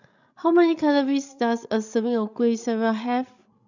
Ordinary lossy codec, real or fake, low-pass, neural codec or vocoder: none; fake; 7.2 kHz; codec, 16 kHz, 16 kbps, FreqCodec, larger model